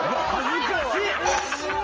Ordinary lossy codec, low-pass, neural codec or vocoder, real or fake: Opus, 24 kbps; 7.2 kHz; none; real